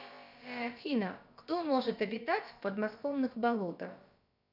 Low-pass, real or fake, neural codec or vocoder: 5.4 kHz; fake; codec, 16 kHz, about 1 kbps, DyCAST, with the encoder's durations